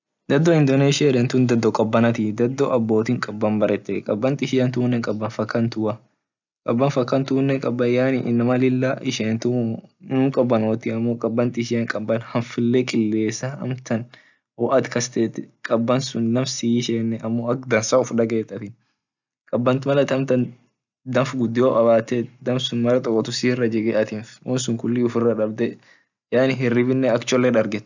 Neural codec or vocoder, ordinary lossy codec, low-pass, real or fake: none; none; 7.2 kHz; real